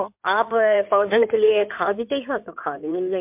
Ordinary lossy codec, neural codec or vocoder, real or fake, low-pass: MP3, 32 kbps; codec, 16 kHz in and 24 kHz out, 2.2 kbps, FireRedTTS-2 codec; fake; 3.6 kHz